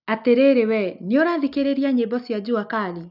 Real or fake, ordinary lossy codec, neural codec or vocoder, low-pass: real; none; none; 5.4 kHz